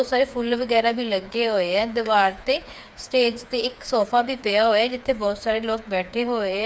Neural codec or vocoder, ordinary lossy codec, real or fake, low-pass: codec, 16 kHz, 4 kbps, FreqCodec, smaller model; none; fake; none